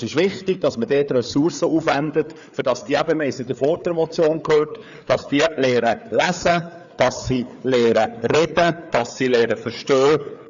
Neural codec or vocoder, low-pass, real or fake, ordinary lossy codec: codec, 16 kHz, 4 kbps, FreqCodec, larger model; 7.2 kHz; fake; MP3, 96 kbps